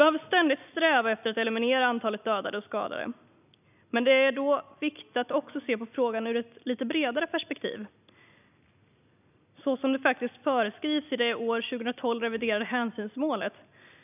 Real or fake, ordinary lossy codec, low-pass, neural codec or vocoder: real; none; 3.6 kHz; none